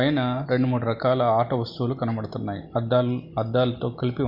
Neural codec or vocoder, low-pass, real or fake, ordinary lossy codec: none; 5.4 kHz; real; Opus, 64 kbps